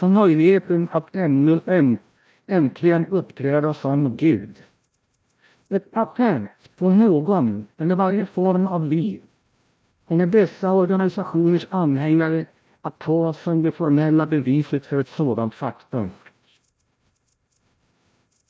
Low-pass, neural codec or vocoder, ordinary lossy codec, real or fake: none; codec, 16 kHz, 0.5 kbps, FreqCodec, larger model; none; fake